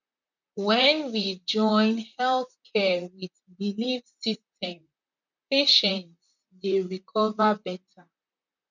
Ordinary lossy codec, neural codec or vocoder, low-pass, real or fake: none; vocoder, 44.1 kHz, 128 mel bands, Pupu-Vocoder; 7.2 kHz; fake